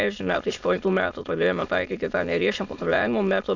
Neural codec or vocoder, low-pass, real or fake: autoencoder, 22.05 kHz, a latent of 192 numbers a frame, VITS, trained on many speakers; 7.2 kHz; fake